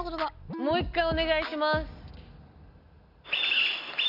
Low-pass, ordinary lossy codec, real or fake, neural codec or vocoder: 5.4 kHz; none; real; none